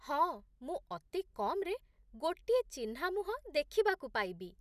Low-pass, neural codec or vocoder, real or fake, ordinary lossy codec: 14.4 kHz; none; real; none